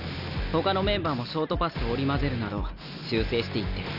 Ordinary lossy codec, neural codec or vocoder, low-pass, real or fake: none; none; 5.4 kHz; real